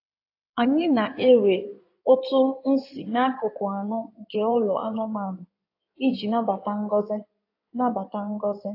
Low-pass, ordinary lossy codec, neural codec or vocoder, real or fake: 5.4 kHz; AAC, 32 kbps; codec, 16 kHz in and 24 kHz out, 2.2 kbps, FireRedTTS-2 codec; fake